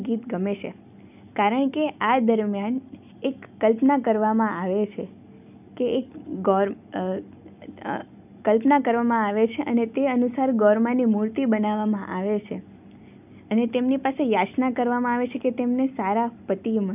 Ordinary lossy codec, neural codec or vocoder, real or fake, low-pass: none; none; real; 3.6 kHz